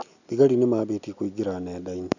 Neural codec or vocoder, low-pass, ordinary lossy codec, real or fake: none; 7.2 kHz; none; real